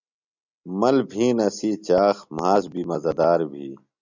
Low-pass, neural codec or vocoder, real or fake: 7.2 kHz; none; real